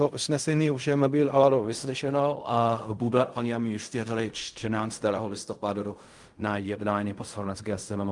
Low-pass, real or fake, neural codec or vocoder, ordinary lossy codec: 10.8 kHz; fake; codec, 16 kHz in and 24 kHz out, 0.4 kbps, LongCat-Audio-Codec, fine tuned four codebook decoder; Opus, 32 kbps